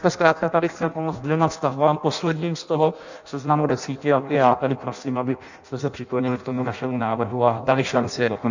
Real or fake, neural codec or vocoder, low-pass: fake; codec, 16 kHz in and 24 kHz out, 0.6 kbps, FireRedTTS-2 codec; 7.2 kHz